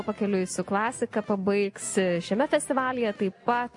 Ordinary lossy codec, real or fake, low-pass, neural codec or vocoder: MP3, 48 kbps; real; 10.8 kHz; none